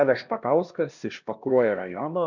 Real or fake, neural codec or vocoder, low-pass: fake; codec, 16 kHz, 1 kbps, X-Codec, HuBERT features, trained on LibriSpeech; 7.2 kHz